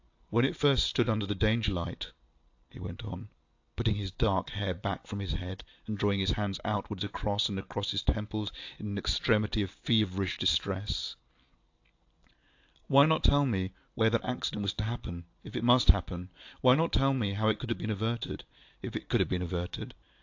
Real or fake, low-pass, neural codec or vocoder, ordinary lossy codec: real; 7.2 kHz; none; AAC, 48 kbps